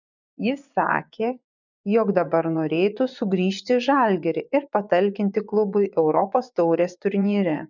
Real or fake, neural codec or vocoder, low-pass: fake; vocoder, 24 kHz, 100 mel bands, Vocos; 7.2 kHz